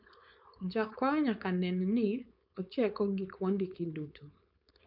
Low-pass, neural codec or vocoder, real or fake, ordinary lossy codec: 5.4 kHz; codec, 16 kHz, 4.8 kbps, FACodec; fake; none